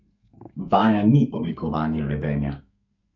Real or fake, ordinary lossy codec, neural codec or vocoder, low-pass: fake; none; codec, 32 kHz, 1.9 kbps, SNAC; 7.2 kHz